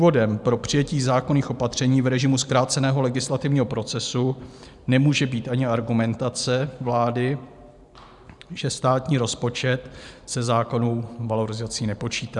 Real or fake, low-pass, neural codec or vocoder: fake; 10.8 kHz; vocoder, 44.1 kHz, 128 mel bands every 512 samples, BigVGAN v2